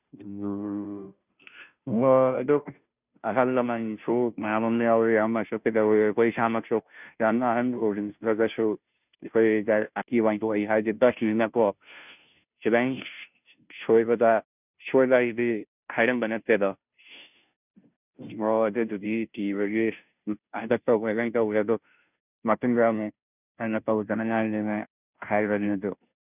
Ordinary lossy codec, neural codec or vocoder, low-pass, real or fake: none; codec, 16 kHz, 0.5 kbps, FunCodec, trained on Chinese and English, 25 frames a second; 3.6 kHz; fake